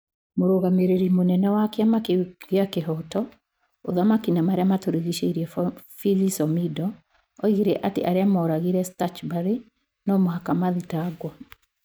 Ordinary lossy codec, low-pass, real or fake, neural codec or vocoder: none; none; real; none